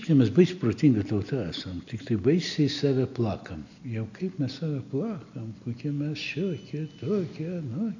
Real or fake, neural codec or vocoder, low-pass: real; none; 7.2 kHz